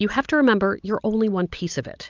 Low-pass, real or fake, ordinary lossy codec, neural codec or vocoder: 7.2 kHz; real; Opus, 24 kbps; none